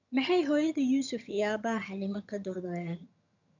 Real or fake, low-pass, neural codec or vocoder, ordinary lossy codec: fake; 7.2 kHz; vocoder, 22.05 kHz, 80 mel bands, HiFi-GAN; none